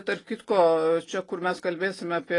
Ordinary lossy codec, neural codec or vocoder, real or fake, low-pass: AAC, 32 kbps; none; real; 10.8 kHz